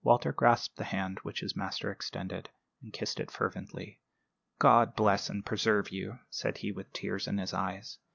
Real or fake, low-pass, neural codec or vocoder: real; 7.2 kHz; none